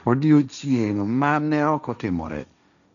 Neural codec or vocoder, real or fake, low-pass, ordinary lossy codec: codec, 16 kHz, 1.1 kbps, Voila-Tokenizer; fake; 7.2 kHz; none